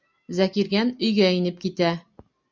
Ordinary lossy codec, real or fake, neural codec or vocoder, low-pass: MP3, 64 kbps; real; none; 7.2 kHz